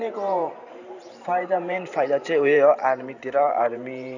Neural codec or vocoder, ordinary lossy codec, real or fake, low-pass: vocoder, 44.1 kHz, 128 mel bands every 512 samples, BigVGAN v2; none; fake; 7.2 kHz